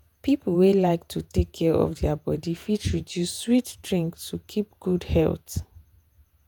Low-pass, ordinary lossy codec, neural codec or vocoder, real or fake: none; none; vocoder, 48 kHz, 128 mel bands, Vocos; fake